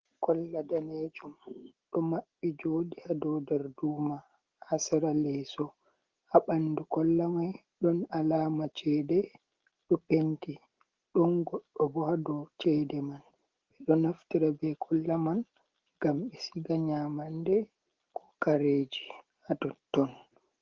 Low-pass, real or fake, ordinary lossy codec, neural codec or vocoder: 7.2 kHz; real; Opus, 16 kbps; none